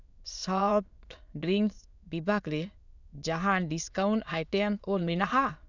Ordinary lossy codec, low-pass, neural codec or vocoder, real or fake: none; 7.2 kHz; autoencoder, 22.05 kHz, a latent of 192 numbers a frame, VITS, trained on many speakers; fake